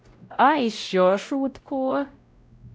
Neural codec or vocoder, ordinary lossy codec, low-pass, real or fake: codec, 16 kHz, 0.5 kbps, X-Codec, WavLM features, trained on Multilingual LibriSpeech; none; none; fake